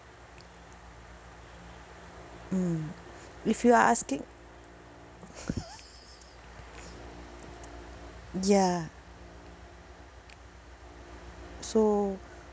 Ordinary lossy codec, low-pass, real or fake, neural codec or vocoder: none; none; real; none